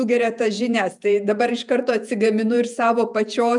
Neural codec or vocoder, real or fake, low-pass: vocoder, 48 kHz, 128 mel bands, Vocos; fake; 10.8 kHz